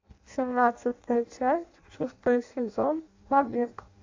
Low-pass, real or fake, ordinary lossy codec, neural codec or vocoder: 7.2 kHz; fake; AAC, 48 kbps; codec, 16 kHz in and 24 kHz out, 0.6 kbps, FireRedTTS-2 codec